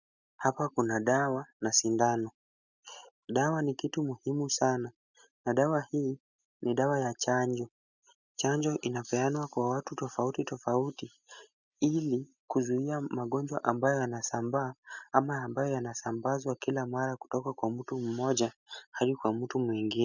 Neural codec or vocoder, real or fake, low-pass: none; real; 7.2 kHz